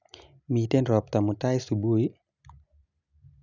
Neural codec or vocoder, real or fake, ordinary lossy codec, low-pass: none; real; none; 7.2 kHz